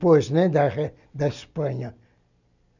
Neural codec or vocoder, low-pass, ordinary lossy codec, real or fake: none; 7.2 kHz; none; real